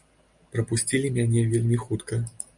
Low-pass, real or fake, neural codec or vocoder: 10.8 kHz; real; none